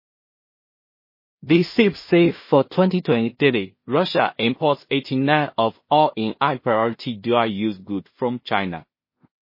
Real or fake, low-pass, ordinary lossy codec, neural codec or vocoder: fake; 5.4 kHz; MP3, 24 kbps; codec, 16 kHz in and 24 kHz out, 0.4 kbps, LongCat-Audio-Codec, two codebook decoder